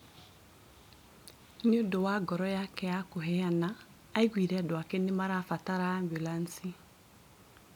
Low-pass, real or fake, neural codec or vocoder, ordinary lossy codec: 19.8 kHz; real; none; none